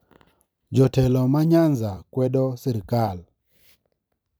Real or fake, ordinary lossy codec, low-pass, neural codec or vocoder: fake; none; none; vocoder, 44.1 kHz, 128 mel bands every 256 samples, BigVGAN v2